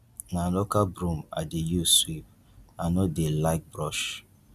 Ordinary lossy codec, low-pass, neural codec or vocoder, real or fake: none; 14.4 kHz; none; real